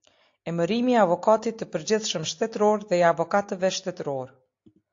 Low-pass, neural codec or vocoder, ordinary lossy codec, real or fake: 7.2 kHz; none; AAC, 48 kbps; real